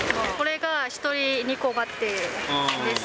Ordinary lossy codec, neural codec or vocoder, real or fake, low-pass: none; none; real; none